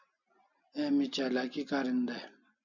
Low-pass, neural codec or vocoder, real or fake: 7.2 kHz; none; real